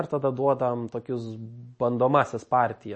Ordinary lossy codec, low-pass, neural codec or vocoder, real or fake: MP3, 32 kbps; 9.9 kHz; none; real